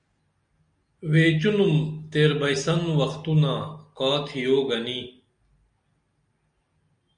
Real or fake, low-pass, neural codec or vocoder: real; 9.9 kHz; none